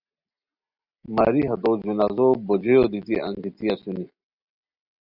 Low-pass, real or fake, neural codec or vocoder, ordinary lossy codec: 5.4 kHz; real; none; Opus, 64 kbps